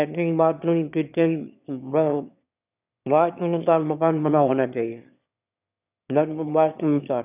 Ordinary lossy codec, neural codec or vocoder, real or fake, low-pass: none; autoencoder, 22.05 kHz, a latent of 192 numbers a frame, VITS, trained on one speaker; fake; 3.6 kHz